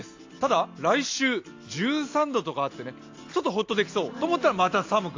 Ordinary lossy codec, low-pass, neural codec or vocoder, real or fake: AAC, 48 kbps; 7.2 kHz; none; real